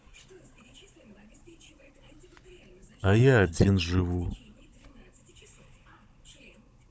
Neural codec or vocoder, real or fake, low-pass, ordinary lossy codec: codec, 16 kHz, 16 kbps, FunCodec, trained on Chinese and English, 50 frames a second; fake; none; none